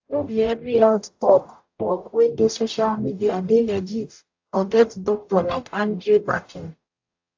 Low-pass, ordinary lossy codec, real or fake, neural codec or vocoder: 7.2 kHz; AAC, 48 kbps; fake; codec, 44.1 kHz, 0.9 kbps, DAC